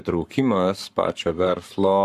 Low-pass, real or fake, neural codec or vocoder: 14.4 kHz; fake; codec, 44.1 kHz, 7.8 kbps, Pupu-Codec